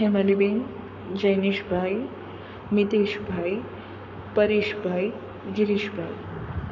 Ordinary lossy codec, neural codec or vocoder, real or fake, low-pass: none; codec, 44.1 kHz, 7.8 kbps, Pupu-Codec; fake; 7.2 kHz